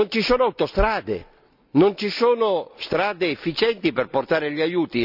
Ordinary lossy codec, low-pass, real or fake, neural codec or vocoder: none; 5.4 kHz; real; none